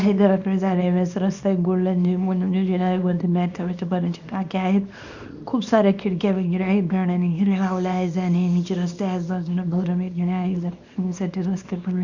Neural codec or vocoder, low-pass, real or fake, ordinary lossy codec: codec, 24 kHz, 0.9 kbps, WavTokenizer, small release; 7.2 kHz; fake; none